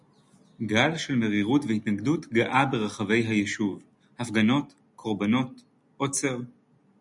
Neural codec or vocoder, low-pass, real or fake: none; 10.8 kHz; real